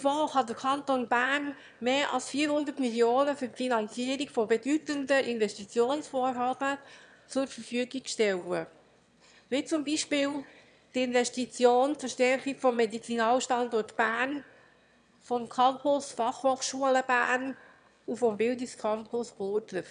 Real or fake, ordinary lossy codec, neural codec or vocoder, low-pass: fake; none; autoencoder, 22.05 kHz, a latent of 192 numbers a frame, VITS, trained on one speaker; 9.9 kHz